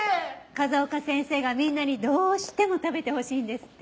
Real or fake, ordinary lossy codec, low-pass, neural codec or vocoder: real; none; none; none